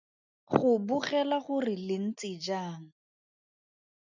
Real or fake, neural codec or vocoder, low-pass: real; none; 7.2 kHz